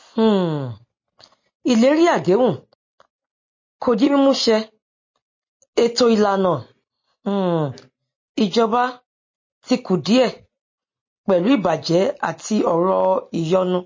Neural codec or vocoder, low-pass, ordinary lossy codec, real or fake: none; 7.2 kHz; MP3, 32 kbps; real